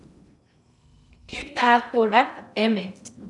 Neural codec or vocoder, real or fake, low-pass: codec, 16 kHz in and 24 kHz out, 0.6 kbps, FocalCodec, streaming, 2048 codes; fake; 10.8 kHz